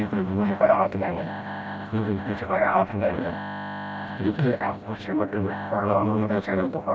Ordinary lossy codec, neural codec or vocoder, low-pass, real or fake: none; codec, 16 kHz, 0.5 kbps, FreqCodec, smaller model; none; fake